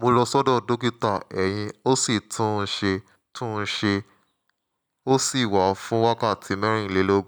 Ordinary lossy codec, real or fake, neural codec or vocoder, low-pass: none; real; none; 19.8 kHz